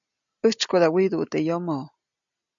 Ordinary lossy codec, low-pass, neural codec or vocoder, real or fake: MP3, 96 kbps; 7.2 kHz; none; real